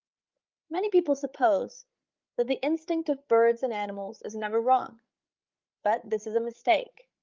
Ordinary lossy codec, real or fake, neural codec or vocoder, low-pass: Opus, 32 kbps; fake; codec, 16 kHz, 8 kbps, FreqCodec, larger model; 7.2 kHz